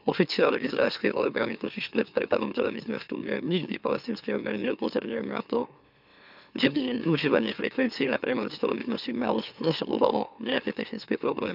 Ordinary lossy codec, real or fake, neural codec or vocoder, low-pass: none; fake; autoencoder, 44.1 kHz, a latent of 192 numbers a frame, MeloTTS; 5.4 kHz